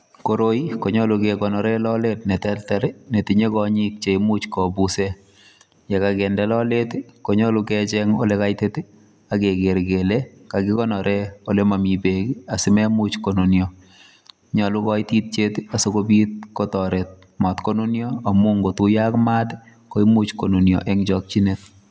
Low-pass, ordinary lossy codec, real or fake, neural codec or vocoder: none; none; real; none